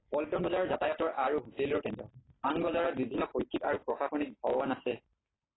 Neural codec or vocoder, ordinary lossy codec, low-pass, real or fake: none; AAC, 16 kbps; 7.2 kHz; real